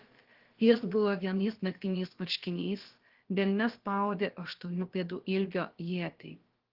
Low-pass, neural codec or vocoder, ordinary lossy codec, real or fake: 5.4 kHz; codec, 16 kHz, about 1 kbps, DyCAST, with the encoder's durations; Opus, 16 kbps; fake